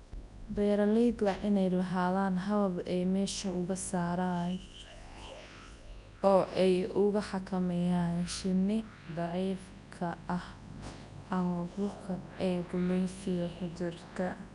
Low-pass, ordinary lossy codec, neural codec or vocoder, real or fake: 10.8 kHz; none; codec, 24 kHz, 0.9 kbps, WavTokenizer, large speech release; fake